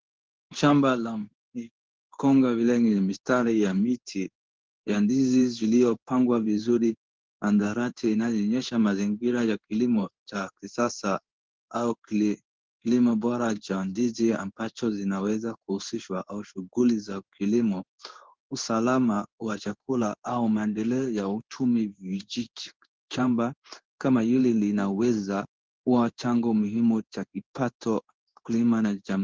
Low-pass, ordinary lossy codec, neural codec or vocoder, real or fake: 7.2 kHz; Opus, 16 kbps; codec, 16 kHz in and 24 kHz out, 1 kbps, XY-Tokenizer; fake